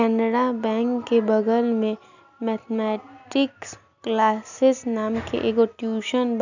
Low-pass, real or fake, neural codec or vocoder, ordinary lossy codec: 7.2 kHz; real; none; none